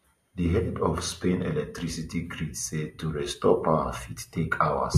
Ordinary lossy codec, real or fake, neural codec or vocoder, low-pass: MP3, 64 kbps; real; none; 14.4 kHz